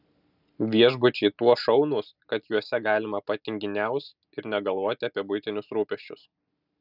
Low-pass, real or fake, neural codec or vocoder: 5.4 kHz; real; none